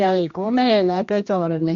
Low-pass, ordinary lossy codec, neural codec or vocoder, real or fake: 7.2 kHz; MP3, 48 kbps; codec, 16 kHz, 1 kbps, X-Codec, HuBERT features, trained on general audio; fake